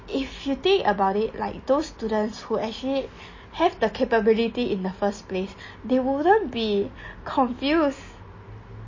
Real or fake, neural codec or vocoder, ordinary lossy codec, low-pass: real; none; MP3, 32 kbps; 7.2 kHz